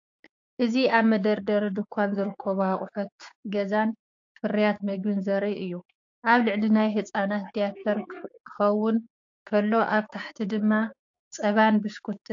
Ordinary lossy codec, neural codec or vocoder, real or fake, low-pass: AAC, 48 kbps; codec, 16 kHz, 6 kbps, DAC; fake; 7.2 kHz